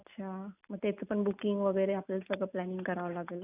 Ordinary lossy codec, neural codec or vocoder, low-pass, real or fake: none; none; 3.6 kHz; real